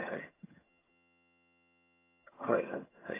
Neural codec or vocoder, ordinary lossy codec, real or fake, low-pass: vocoder, 22.05 kHz, 80 mel bands, HiFi-GAN; AAC, 16 kbps; fake; 3.6 kHz